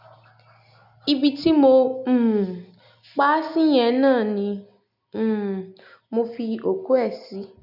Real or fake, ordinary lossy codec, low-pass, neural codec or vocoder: real; none; 5.4 kHz; none